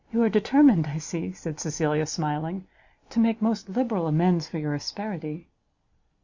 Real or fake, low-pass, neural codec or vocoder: real; 7.2 kHz; none